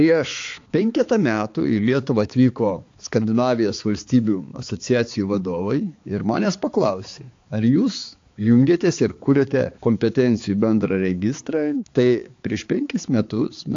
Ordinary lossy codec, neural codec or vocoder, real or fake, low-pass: AAC, 48 kbps; codec, 16 kHz, 4 kbps, X-Codec, HuBERT features, trained on balanced general audio; fake; 7.2 kHz